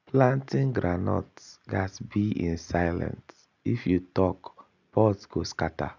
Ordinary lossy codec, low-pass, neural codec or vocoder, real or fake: none; 7.2 kHz; none; real